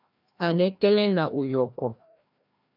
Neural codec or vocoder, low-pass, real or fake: codec, 16 kHz, 1 kbps, FreqCodec, larger model; 5.4 kHz; fake